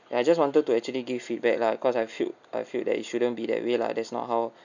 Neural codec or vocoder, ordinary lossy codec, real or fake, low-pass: none; none; real; 7.2 kHz